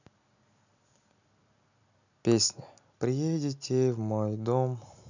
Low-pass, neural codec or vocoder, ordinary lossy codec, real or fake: 7.2 kHz; none; none; real